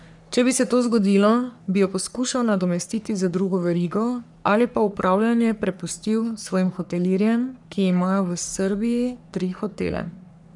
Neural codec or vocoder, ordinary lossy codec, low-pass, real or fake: codec, 44.1 kHz, 3.4 kbps, Pupu-Codec; none; 10.8 kHz; fake